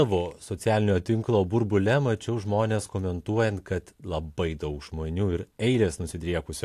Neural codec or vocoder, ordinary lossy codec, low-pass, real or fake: none; AAC, 64 kbps; 14.4 kHz; real